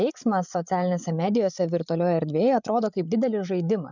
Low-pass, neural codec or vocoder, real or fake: 7.2 kHz; codec, 16 kHz, 8 kbps, FreqCodec, larger model; fake